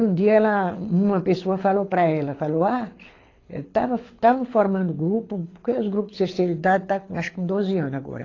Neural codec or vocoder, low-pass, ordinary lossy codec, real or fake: codec, 24 kHz, 6 kbps, HILCodec; 7.2 kHz; AAC, 32 kbps; fake